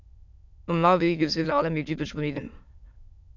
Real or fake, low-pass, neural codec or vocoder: fake; 7.2 kHz; autoencoder, 22.05 kHz, a latent of 192 numbers a frame, VITS, trained on many speakers